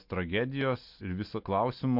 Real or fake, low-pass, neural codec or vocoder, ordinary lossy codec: real; 5.4 kHz; none; MP3, 48 kbps